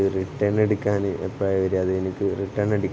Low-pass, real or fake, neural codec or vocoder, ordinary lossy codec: none; real; none; none